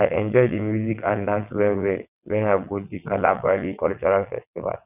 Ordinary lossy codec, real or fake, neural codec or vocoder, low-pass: none; fake; vocoder, 22.05 kHz, 80 mel bands, WaveNeXt; 3.6 kHz